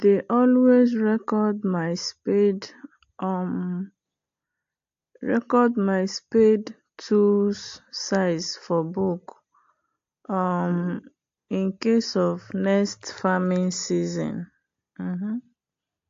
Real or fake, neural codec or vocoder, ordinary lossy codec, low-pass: real; none; AAC, 48 kbps; 7.2 kHz